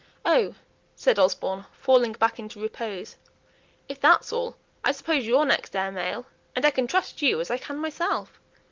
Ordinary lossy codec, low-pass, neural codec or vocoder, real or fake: Opus, 32 kbps; 7.2 kHz; none; real